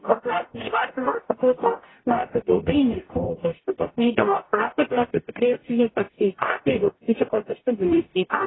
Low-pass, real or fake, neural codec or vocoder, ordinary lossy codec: 7.2 kHz; fake; codec, 44.1 kHz, 0.9 kbps, DAC; AAC, 16 kbps